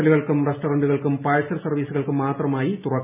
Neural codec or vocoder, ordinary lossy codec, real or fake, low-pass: none; none; real; 3.6 kHz